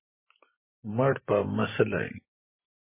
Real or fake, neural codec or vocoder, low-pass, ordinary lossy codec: fake; vocoder, 44.1 kHz, 128 mel bands every 512 samples, BigVGAN v2; 3.6 kHz; MP3, 16 kbps